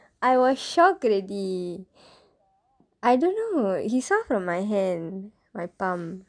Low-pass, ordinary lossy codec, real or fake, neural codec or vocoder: 9.9 kHz; none; real; none